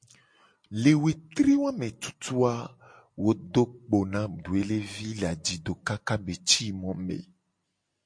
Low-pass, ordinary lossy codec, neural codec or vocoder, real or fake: 9.9 kHz; MP3, 48 kbps; none; real